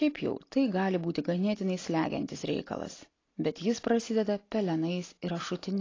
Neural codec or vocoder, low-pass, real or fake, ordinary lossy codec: none; 7.2 kHz; real; AAC, 32 kbps